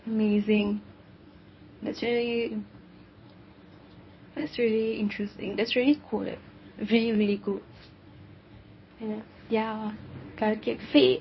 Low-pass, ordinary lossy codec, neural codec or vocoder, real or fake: 7.2 kHz; MP3, 24 kbps; codec, 24 kHz, 0.9 kbps, WavTokenizer, medium speech release version 1; fake